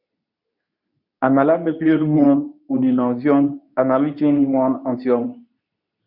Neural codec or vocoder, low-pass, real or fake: codec, 24 kHz, 0.9 kbps, WavTokenizer, medium speech release version 2; 5.4 kHz; fake